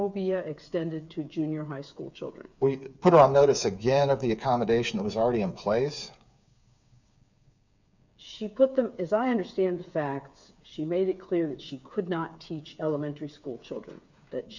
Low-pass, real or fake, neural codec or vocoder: 7.2 kHz; fake; codec, 16 kHz, 8 kbps, FreqCodec, smaller model